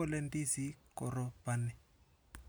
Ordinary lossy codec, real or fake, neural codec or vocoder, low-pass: none; real; none; none